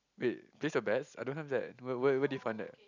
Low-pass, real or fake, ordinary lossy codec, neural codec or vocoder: 7.2 kHz; real; none; none